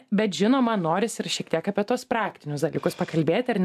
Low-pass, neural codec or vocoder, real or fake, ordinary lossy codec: 14.4 kHz; none; real; AAC, 96 kbps